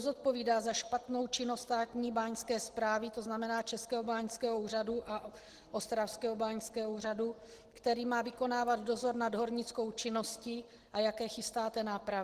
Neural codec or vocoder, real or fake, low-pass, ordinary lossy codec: none; real; 14.4 kHz; Opus, 16 kbps